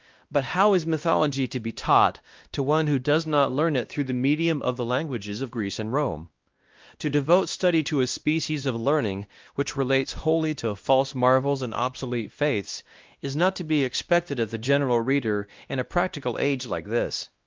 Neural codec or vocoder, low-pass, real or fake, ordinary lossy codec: codec, 16 kHz, 1 kbps, X-Codec, WavLM features, trained on Multilingual LibriSpeech; 7.2 kHz; fake; Opus, 32 kbps